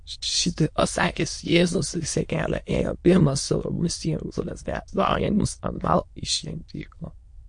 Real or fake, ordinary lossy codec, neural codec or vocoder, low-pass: fake; MP3, 48 kbps; autoencoder, 22.05 kHz, a latent of 192 numbers a frame, VITS, trained on many speakers; 9.9 kHz